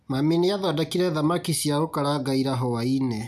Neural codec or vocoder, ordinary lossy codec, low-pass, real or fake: none; none; 14.4 kHz; real